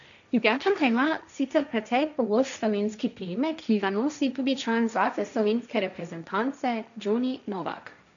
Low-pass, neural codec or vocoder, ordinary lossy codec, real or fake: 7.2 kHz; codec, 16 kHz, 1.1 kbps, Voila-Tokenizer; none; fake